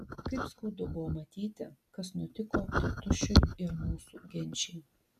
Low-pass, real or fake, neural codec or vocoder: 14.4 kHz; real; none